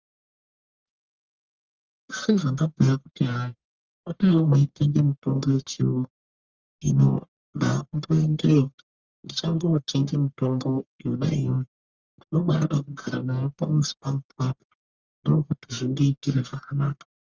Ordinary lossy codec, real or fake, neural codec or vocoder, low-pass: Opus, 32 kbps; fake; codec, 44.1 kHz, 1.7 kbps, Pupu-Codec; 7.2 kHz